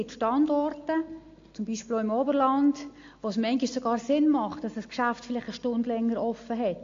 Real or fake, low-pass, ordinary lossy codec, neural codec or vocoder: real; 7.2 kHz; AAC, 48 kbps; none